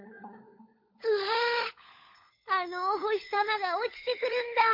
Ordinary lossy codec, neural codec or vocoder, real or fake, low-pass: MP3, 32 kbps; codec, 16 kHz, 16 kbps, FunCodec, trained on LibriTTS, 50 frames a second; fake; 5.4 kHz